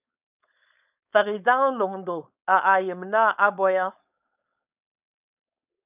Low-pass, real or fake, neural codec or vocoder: 3.6 kHz; fake; codec, 16 kHz, 4.8 kbps, FACodec